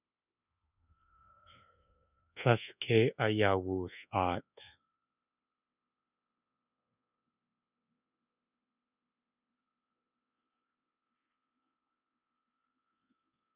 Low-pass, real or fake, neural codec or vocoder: 3.6 kHz; fake; codec, 24 kHz, 1.2 kbps, DualCodec